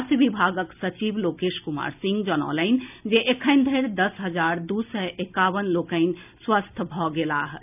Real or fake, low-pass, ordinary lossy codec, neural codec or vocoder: real; 3.6 kHz; none; none